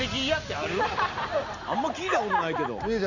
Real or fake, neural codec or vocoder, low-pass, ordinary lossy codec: real; none; 7.2 kHz; Opus, 64 kbps